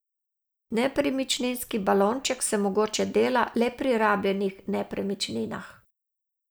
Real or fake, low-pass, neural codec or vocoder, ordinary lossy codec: real; none; none; none